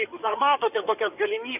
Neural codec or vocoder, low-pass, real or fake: codec, 16 kHz in and 24 kHz out, 2.2 kbps, FireRedTTS-2 codec; 3.6 kHz; fake